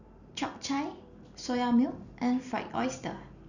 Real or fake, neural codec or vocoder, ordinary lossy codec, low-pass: real; none; none; 7.2 kHz